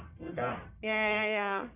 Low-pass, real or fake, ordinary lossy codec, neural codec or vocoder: 3.6 kHz; fake; none; codec, 44.1 kHz, 1.7 kbps, Pupu-Codec